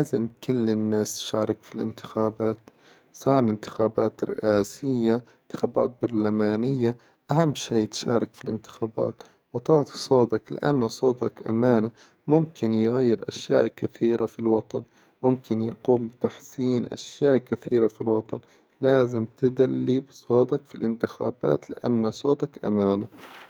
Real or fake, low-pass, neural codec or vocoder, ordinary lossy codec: fake; none; codec, 44.1 kHz, 2.6 kbps, SNAC; none